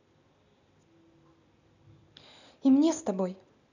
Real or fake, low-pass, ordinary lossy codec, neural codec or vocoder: real; 7.2 kHz; none; none